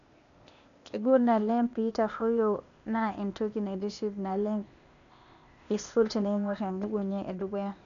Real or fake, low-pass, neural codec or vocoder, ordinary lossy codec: fake; 7.2 kHz; codec, 16 kHz, 0.8 kbps, ZipCodec; none